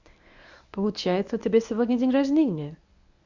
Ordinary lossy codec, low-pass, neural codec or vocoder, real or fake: Opus, 64 kbps; 7.2 kHz; codec, 24 kHz, 0.9 kbps, WavTokenizer, medium speech release version 1; fake